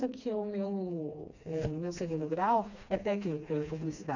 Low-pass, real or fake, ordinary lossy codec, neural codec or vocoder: 7.2 kHz; fake; none; codec, 16 kHz, 2 kbps, FreqCodec, smaller model